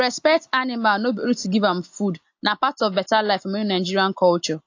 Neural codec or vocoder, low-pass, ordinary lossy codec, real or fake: none; 7.2 kHz; AAC, 48 kbps; real